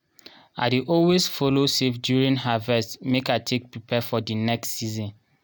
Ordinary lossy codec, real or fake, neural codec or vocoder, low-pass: none; real; none; none